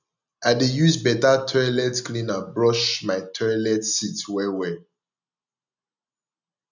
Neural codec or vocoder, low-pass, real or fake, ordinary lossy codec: none; 7.2 kHz; real; none